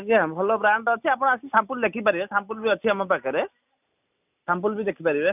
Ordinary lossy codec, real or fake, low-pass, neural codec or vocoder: none; real; 3.6 kHz; none